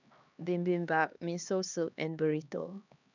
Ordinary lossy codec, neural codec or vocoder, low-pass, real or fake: none; codec, 16 kHz, 2 kbps, X-Codec, HuBERT features, trained on LibriSpeech; 7.2 kHz; fake